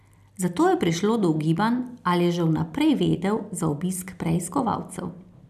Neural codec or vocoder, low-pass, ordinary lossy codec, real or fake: none; 14.4 kHz; none; real